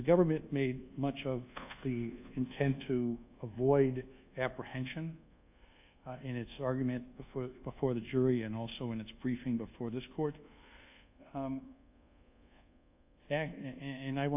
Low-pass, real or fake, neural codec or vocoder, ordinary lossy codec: 3.6 kHz; fake; codec, 24 kHz, 1.2 kbps, DualCodec; AAC, 32 kbps